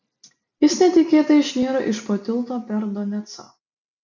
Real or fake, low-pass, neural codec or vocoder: real; 7.2 kHz; none